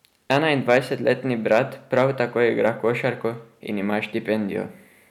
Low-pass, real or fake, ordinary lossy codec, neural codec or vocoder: 19.8 kHz; real; none; none